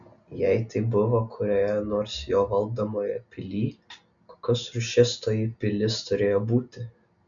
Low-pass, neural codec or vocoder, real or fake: 7.2 kHz; none; real